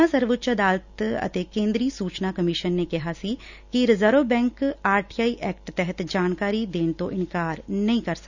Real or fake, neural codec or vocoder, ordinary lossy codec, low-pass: real; none; none; 7.2 kHz